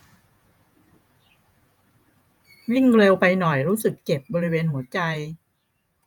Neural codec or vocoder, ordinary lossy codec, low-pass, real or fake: vocoder, 48 kHz, 128 mel bands, Vocos; none; 19.8 kHz; fake